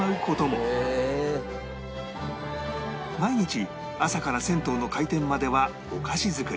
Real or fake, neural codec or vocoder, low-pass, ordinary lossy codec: real; none; none; none